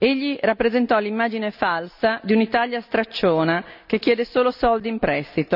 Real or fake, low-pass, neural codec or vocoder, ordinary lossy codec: real; 5.4 kHz; none; none